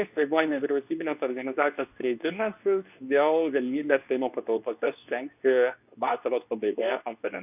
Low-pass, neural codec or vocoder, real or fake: 3.6 kHz; codec, 24 kHz, 0.9 kbps, WavTokenizer, medium speech release version 2; fake